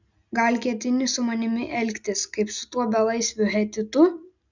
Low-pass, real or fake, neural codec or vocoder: 7.2 kHz; real; none